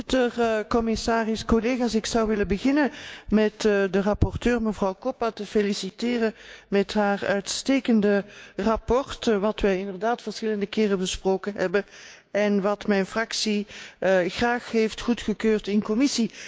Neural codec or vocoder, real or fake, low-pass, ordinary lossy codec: codec, 16 kHz, 6 kbps, DAC; fake; none; none